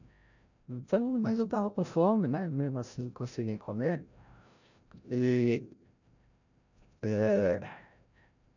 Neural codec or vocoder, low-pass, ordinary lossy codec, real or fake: codec, 16 kHz, 0.5 kbps, FreqCodec, larger model; 7.2 kHz; none; fake